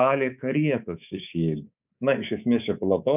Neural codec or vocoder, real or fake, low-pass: codec, 24 kHz, 3.1 kbps, DualCodec; fake; 3.6 kHz